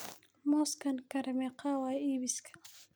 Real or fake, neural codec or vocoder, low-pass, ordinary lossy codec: real; none; none; none